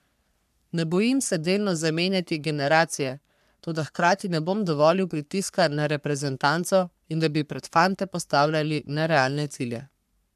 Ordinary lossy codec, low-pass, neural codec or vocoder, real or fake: none; 14.4 kHz; codec, 44.1 kHz, 3.4 kbps, Pupu-Codec; fake